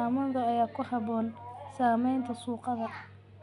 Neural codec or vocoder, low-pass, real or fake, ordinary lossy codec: none; 10.8 kHz; real; none